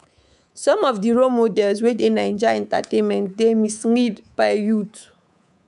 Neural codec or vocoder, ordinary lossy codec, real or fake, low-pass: codec, 24 kHz, 3.1 kbps, DualCodec; none; fake; 10.8 kHz